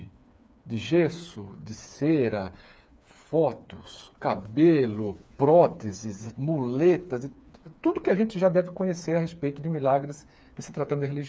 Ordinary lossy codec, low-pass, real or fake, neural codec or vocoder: none; none; fake; codec, 16 kHz, 4 kbps, FreqCodec, smaller model